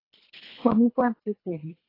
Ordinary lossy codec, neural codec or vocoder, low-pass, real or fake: AAC, 24 kbps; codec, 24 kHz, 3 kbps, HILCodec; 5.4 kHz; fake